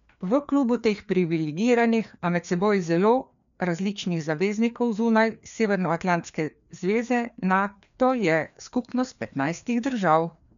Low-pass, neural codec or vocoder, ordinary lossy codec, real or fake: 7.2 kHz; codec, 16 kHz, 2 kbps, FreqCodec, larger model; none; fake